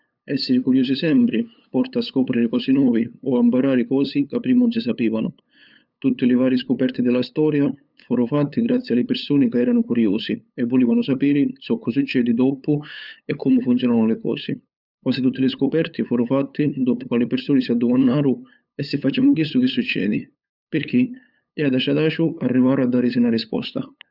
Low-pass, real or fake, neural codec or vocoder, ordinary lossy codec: 5.4 kHz; fake; codec, 16 kHz, 8 kbps, FunCodec, trained on LibriTTS, 25 frames a second; none